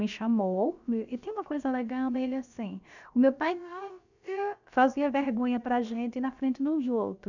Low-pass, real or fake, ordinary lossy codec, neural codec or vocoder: 7.2 kHz; fake; none; codec, 16 kHz, about 1 kbps, DyCAST, with the encoder's durations